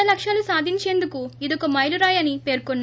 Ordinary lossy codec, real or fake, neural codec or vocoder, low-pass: none; real; none; none